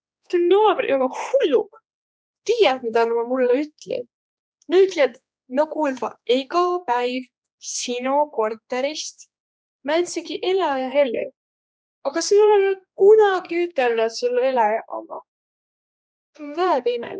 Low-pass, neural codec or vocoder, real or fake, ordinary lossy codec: none; codec, 16 kHz, 2 kbps, X-Codec, HuBERT features, trained on general audio; fake; none